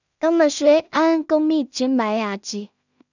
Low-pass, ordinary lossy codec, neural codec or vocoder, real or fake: 7.2 kHz; none; codec, 16 kHz in and 24 kHz out, 0.4 kbps, LongCat-Audio-Codec, two codebook decoder; fake